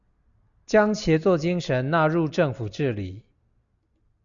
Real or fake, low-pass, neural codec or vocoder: real; 7.2 kHz; none